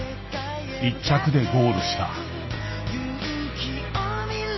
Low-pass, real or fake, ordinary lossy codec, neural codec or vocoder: 7.2 kHz; real; MP3, 24 kbps; none